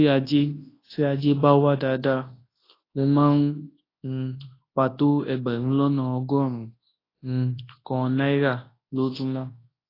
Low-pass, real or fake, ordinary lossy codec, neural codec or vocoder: 5.4 kHz; fake; AAC, 24 kbps; codec, 24 kHz, 0.9 kbps, WavTokenizer, large speech release